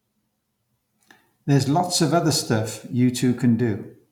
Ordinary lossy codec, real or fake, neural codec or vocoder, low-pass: none; real; none; 19.8 kHz